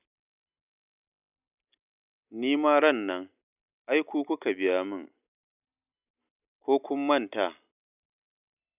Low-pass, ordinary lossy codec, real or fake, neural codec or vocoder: 3.6 kHz; none; real; none